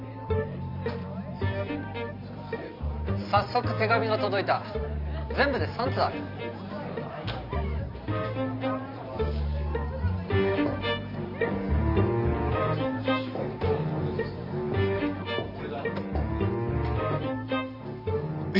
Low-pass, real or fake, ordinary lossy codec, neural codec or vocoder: 5.4 kHz; fake; none; vocoder, 44.1 kHz, 128 mel bands every 512 samples, BigVGAN v2